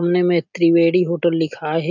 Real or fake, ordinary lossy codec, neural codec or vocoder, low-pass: real; none; none; 7.2 kHz